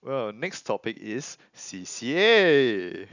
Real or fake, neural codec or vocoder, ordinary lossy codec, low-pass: real; none; none; 7.2 kHz